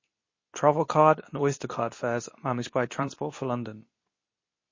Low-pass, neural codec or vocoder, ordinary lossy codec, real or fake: 7.2 kHz; codec, 24 kHz, 0.9 kbps, WavTokenizer, medium speech release version 2; MP3, 32 kbps; fake